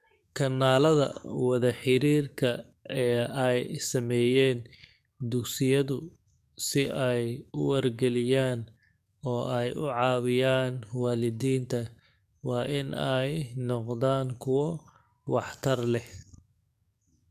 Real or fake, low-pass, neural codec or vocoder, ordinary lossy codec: fake; 14.4 kHz; codec, 44.1 kHz, 7.8 kbps, DAC; MP3, 96 kbps